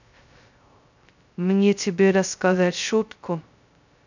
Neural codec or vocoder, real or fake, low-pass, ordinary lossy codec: codec, 16 kHz, 0.2 kbps, FocalCodec; fake; 7.2 kHz; none